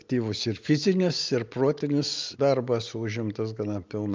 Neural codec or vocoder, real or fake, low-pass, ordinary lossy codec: none; real; 7.2 kHz; Opus, 24 kbps